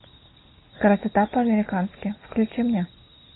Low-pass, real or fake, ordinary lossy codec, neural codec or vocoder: 7.2 kHz; real; AAC, 16 kbps; none